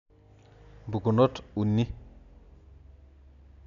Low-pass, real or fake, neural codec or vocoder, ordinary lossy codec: 7.2 kHz; real; none; MP3, 96 kbps